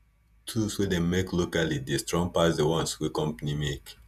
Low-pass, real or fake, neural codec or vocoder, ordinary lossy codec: 14.4 kHz; fake; vocoder, 48 kHz, 128 mel bands, Vocos; none